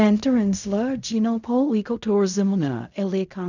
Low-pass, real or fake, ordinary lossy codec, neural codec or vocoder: 7.2 kHz; fake; none; codec, 16 kHz in and 24 kHz out, 0.4 kbps, LongCat-Audio-Codec, fine tuned four codebook decoder